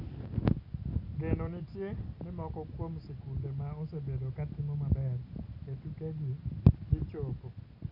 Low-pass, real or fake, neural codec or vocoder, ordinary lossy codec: 5.4 kHz; real; none; none